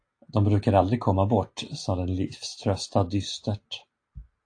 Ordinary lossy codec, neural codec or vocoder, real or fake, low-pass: AAC, 48 kbps; none; real; 9.9 kHz